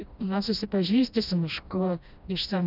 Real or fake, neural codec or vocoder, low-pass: fake; codec, 16 kHz, 1 kbps, FreqCodec, smaller model; 5.4 kHz